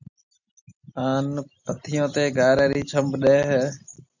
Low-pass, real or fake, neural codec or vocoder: 7.2 kHz; real; none